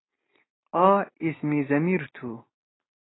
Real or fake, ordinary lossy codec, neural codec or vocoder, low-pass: real; AAC, 16 kbps; none; 7.2 kHz